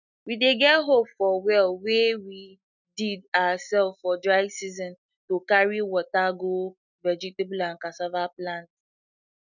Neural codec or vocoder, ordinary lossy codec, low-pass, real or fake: none; none; 7.2 kHz; real